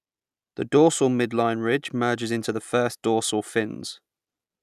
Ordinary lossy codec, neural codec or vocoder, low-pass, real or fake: none; none; 14.4 kHz; real